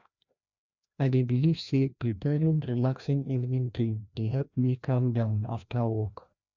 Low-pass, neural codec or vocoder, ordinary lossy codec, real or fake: 7.2 kHz; codec, 16 kHz, 1 kbps, FreqCodec, larger model; none; fake